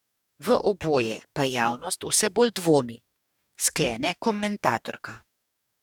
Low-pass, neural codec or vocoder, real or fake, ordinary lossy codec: 19.8 kHz; codec, 44.1 kHz, 2.6 kbps, DAC; fake; none